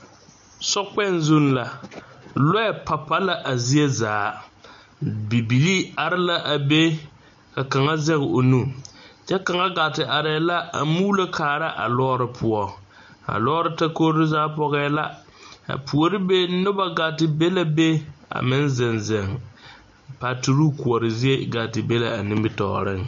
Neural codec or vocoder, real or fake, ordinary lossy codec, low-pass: none; real; MP3, 48 kbps; 7.2 kHz